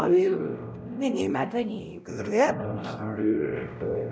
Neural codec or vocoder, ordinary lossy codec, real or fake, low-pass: codec, 16 kHz, 0.5 kbps, X-Codec, WavLM features, trained on Multilingual LibriSpeech; none; fake; none